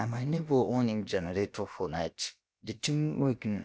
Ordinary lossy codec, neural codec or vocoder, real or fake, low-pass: none; codec, 16 kHz, about 1 kbps, DyCAST, with the encoder's durations; fake; none